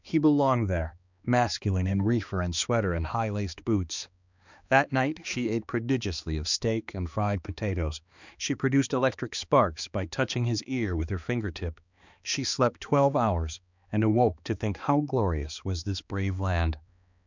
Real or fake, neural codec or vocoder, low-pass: fake; codec, 16 kHz, 2 kbps, X-Codec, HuBERT features, trained on balanced general audio; 7.2 kHz